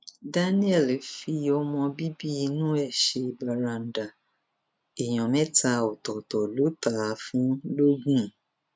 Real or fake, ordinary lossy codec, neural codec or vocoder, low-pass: real; none; none; none